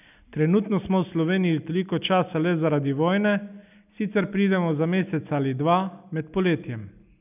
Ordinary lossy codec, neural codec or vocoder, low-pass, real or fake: none; none; 3.6 kHz; real